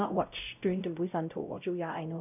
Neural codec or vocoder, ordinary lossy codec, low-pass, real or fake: codec, 16 kHz, 0.5 kbps, X-Codec, WavLM features, trained on Multilingual LibriSpeech; none; 3.6 kHz; fake